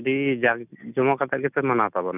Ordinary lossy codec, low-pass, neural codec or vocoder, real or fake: none; 3.6 kHz; none; real